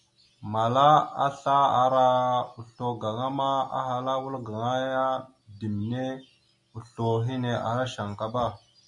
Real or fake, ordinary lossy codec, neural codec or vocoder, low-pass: real; MP3, 96 kbps; none; 10.8 kHz